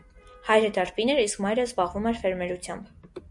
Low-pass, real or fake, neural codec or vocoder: 10.8 kHz; real; none